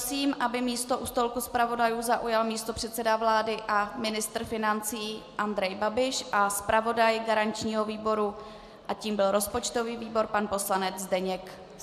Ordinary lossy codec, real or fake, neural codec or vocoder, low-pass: AAC, 96 kbps; real; none; 14.4 kHz